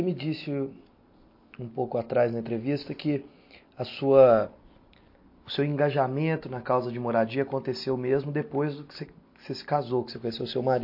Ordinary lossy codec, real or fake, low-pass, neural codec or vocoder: MP3, 32 kbps; real; 5.4 kHz; none